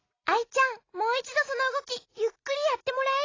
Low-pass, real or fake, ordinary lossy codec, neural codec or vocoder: 7.2 kHz; real; AAC, 32 kbps; none